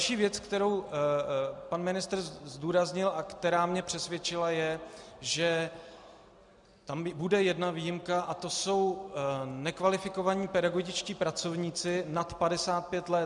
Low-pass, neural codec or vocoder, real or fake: 10.8 kHz; none; real